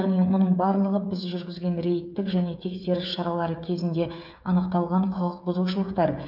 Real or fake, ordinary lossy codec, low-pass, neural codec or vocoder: fake; none; 5.4 kHz; codec, 16 kHz, 8 kbps, FreqCodec, smaller model